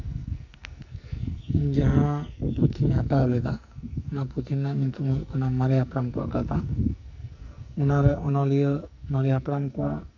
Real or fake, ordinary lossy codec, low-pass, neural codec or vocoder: fake; none; 7.2 kHz; codec, 44.1 kHz, 2.6 kbps, SNAC